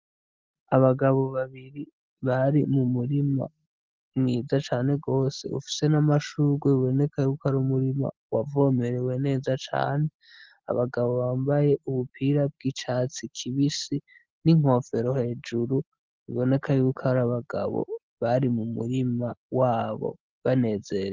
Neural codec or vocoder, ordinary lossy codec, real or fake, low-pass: none; Opus, 32 kbps; real; 7.2 kHz